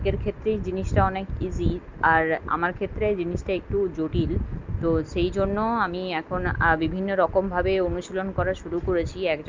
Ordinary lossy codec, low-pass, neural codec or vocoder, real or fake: Opus, 24 kbps; 7.2 kHz; none; real